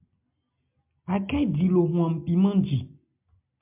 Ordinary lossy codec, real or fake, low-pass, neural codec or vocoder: MP3, 32 kbps; real; 3.6 kHz; none